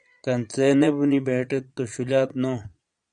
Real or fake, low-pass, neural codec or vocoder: fake; 9.9 kHz; vocoder, 22.05 kHz, 80 mel bands, Vocos